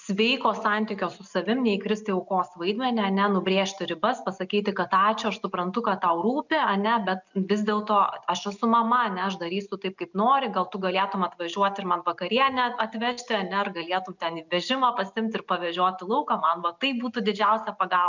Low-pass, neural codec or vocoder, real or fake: 7.2 kHz; none; real